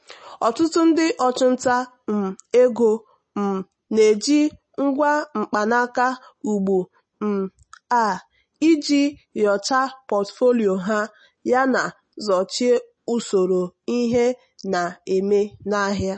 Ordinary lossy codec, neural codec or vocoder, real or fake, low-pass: MP3, 32 kbps; none; real; 9.9 kHz